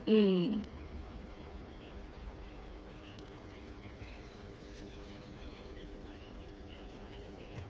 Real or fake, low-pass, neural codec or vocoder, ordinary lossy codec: fake; none; codec, 16 kHz, 4 kbps, FreqCodec, smaller model; none